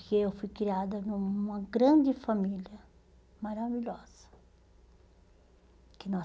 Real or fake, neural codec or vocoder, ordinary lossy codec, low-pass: real; none; none; none